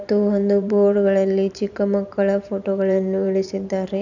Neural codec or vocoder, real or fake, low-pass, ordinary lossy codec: vocoder, 44.1 kHz, 128 mel bands every 512 samples, BigVGAN v2; fake; 7.2 kHz; none